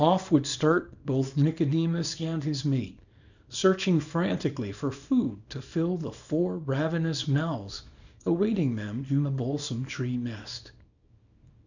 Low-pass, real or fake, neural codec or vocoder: 7.2 kHz; fake; codec, 24 kHz, 0.9 kbps, WavTokenizer, small release